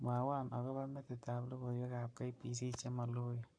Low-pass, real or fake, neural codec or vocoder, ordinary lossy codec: 9.9 kHz; fake; codec, 44.1 kHz, 7.8 kbps, Pupu-Codec; MP3, 96 kbps